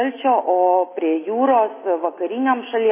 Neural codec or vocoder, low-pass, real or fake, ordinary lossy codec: none; 3.6 kHz; real; MP3, 16 kbps